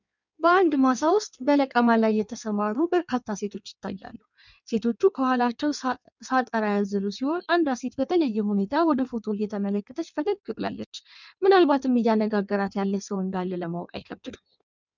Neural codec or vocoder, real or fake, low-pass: codec, 16 kHz in and 24 kHz out, 1.1 kbps, FireRedTTS-2 codec; fake; 7.2 kHz